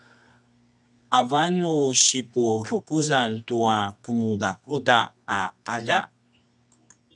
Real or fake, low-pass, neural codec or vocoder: fake; 10.8 kHz; codec, 24 kHz, 0.9 kbps, WavTokenizer, medium music audio release